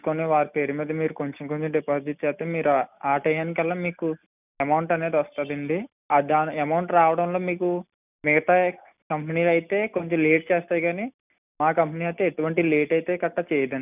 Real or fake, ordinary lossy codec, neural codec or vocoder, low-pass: real; none; none; 3.6 kHz